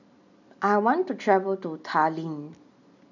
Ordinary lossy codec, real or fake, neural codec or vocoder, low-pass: none; real; none; 7.2 kHz